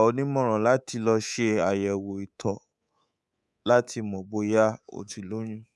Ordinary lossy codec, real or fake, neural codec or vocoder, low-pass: none; fake; codec, 24 kHz, 3.1 kbps, DualCodec; none